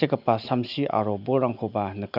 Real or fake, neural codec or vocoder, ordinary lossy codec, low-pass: real; none; none; 5.4 kHz